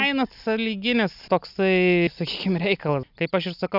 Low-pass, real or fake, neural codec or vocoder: 5.4 kHz; real; none